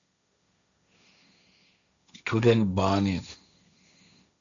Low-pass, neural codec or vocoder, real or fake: 7.2 kHz; codec, 16 kHz, 1.1 kbps, Voila-Tokenizer; fake